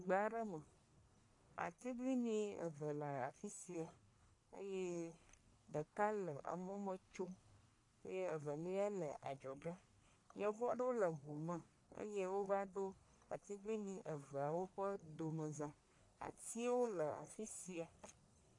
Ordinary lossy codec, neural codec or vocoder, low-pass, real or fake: AAC, 48 kbps; codec, 44.1 kHz, 1.7 kbps, Pupu-Codec; 10.8 kHz; fake